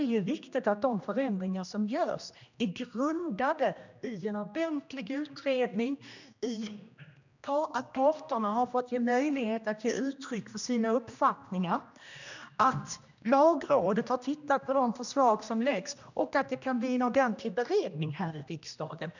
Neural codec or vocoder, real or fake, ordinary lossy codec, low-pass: codec, 16 kHz, 1 kbps, X-Codec, HuBERT features, trained on general audio; fake; none; 7.2 kHz